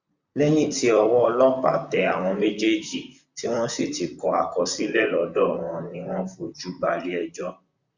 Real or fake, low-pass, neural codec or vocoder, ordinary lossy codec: fake; 7.2 kHz; vocoder, 44.1 kHz, 128 mel bands, Pupu-Vocoder; Opus, 64 kbps